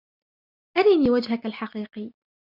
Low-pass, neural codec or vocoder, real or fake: 5.4 kHz; none; real